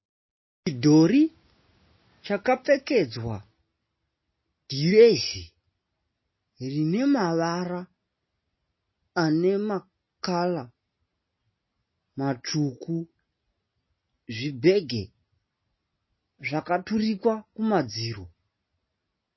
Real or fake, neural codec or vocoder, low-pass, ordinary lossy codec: real; none; 7.2 kHz; MP3, 24 kbps